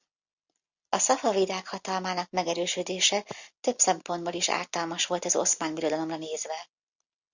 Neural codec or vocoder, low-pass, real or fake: none; 7.2 kHz; real